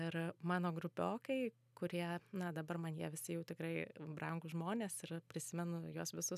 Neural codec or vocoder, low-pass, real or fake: none; 14.4 kHz; real